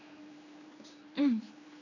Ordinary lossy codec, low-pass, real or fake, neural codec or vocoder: none; 7.2 kHz; fake; codec, 16 kHz, 2 kbps, X-Codec, HuBERT features, trained on general audio